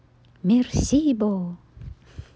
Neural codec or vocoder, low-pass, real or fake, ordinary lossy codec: none; none; real; none